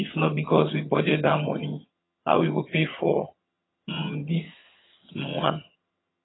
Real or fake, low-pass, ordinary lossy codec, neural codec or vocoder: fake; 7.2 kHz; AAC, 16 kbps; vocoder, 22.05 kHz, 80 mel bands, HiFi-GAN